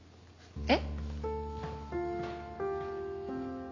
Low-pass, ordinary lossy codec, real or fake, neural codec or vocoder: 7.2 kHz; AAC, 32 kbps; real; none